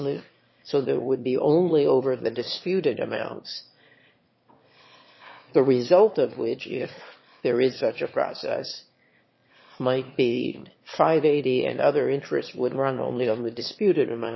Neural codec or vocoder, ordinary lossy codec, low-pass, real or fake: autoencoder, 22.05 kHz, a latent of 192 numbers a frame, VITS, trained on one speaker; MP3, 24 kbps; 7.2 kHz; fake